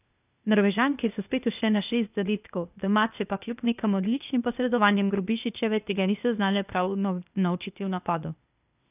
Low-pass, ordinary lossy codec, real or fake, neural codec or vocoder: 3.6 kHz; none; fake; codec, 16 kHz, 0.8 kbps, ZipCodec